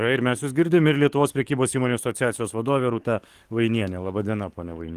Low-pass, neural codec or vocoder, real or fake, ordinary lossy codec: 14.4 kHz; codec, 44.1 kHz, 7.8 kbps, DAC; fake; Opus, 24 kbps